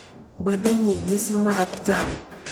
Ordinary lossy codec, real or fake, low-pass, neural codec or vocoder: none; fake; none; codec, 44.1 kHz, 0.9 kbps, DAC